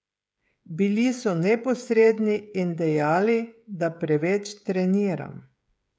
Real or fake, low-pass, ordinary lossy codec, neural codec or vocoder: fake; none; none; codec, 16 kHz, 16 kbps, FreqCodec, smaller model